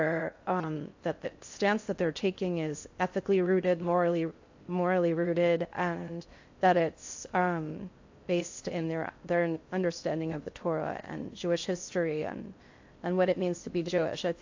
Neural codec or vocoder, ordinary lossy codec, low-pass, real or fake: codec, 16 kHz in and 24 kHz out, 0.6 kbps, FocalCodec, streaming, 2048 codes; AAC, 48 kbps; 7.2 kHz; fake